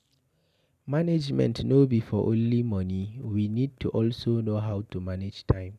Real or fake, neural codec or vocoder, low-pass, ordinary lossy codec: real; none; 14.4 kHz; none